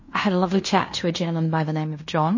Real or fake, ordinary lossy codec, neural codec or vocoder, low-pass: fake; MP3, 32 kbps; codec, 16 kHz in and 24 kHz out, 0.9 kbps, LongCat-Audio-Codec, fine tuned four codebook decoder; 7.2 kHz